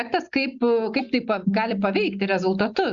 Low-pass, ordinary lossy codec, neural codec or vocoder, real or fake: 7.2 kHz; Opus, 64 kbps; none; real